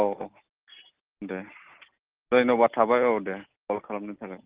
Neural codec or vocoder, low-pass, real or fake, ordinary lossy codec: none; 3.6 kHz; real; Opus, 16 kbps